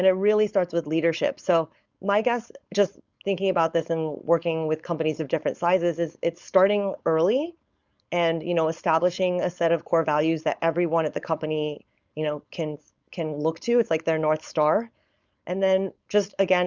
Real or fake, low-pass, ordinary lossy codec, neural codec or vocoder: fake; 7.2 kHz; Opus, 64 kbps; codec, 16 kHz, 4.8 kbps, FACodec